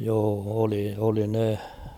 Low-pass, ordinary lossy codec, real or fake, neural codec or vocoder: 19.8 kHz; none; fake; vocoder, 44.1 kHz, 128 mel bands every 512 samples, BigVGAN v2